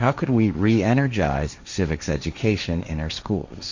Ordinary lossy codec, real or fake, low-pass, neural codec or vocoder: Opus, 64 kbps; fake; 7.2 kHz; codec, 16 kHz, 1.1 kbps, Voila-Tokenizer